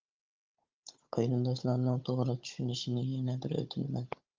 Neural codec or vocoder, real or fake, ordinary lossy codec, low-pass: codec, 16 kHz, 16 kbps, FunCodec, trained on Chinese and English, 50 frames a second; fake; Opus, 24 kbps; 7.2 kHz